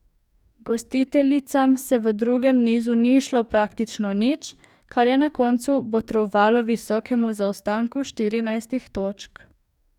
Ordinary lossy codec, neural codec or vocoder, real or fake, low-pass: none; codec, 44.1 kHz, 2.6 kbps, DAC; fake; 19.8 kHz